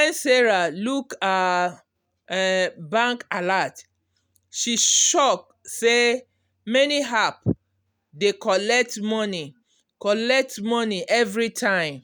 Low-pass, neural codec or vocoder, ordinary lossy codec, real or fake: none; none; none; real